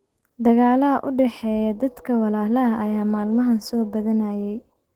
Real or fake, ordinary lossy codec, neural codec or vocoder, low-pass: fake; Opus, 16 kbps; autoencoder, 48 kHz, 128 numbers a frame, DAC-VAE, trained on Japanese speech; 19.8 kHz